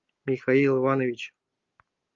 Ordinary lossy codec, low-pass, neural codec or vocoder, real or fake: Opus, 32 kbps; 7.2 kHz; none; real